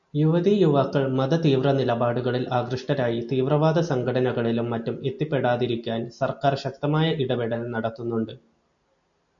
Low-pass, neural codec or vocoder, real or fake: 7.2 kHz; none; real